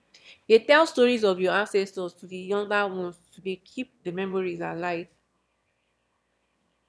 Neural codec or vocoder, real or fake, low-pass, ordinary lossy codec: autoencoder, 22.05 kHz, a latent of 192 numbers a frame, VITS, trained on one speaker; fake; none; none